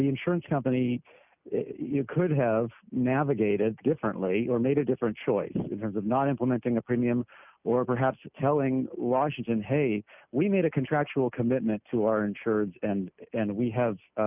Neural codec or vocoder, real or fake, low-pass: none; real; 3.6 kHz